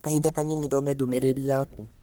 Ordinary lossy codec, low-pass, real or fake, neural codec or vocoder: none; none; fake; codec, 44.1 kHz, 1.7 kbps, Pupu-Codec